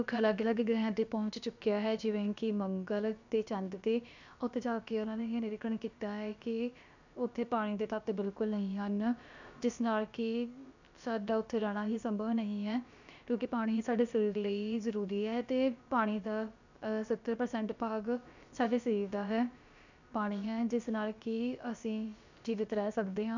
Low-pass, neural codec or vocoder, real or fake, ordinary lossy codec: 7.2 kHz; codec, 16 kHz, about 1 kbps, DyCAST, with the encoder's durations; fake; none